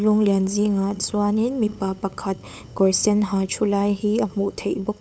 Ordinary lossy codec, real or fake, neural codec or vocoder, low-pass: none; fake; codec, 16 kHz, 8 kbps, FunCodec, trained on LibriTTS, 25 frames a second; none